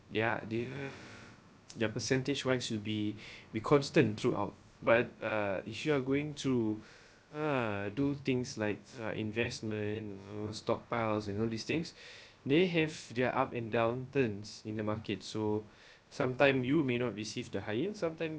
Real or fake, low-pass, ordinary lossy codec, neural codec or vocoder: fake; none; none; codec, 16 kHz, about 1 kbps, DyCAST, with the encoder's durations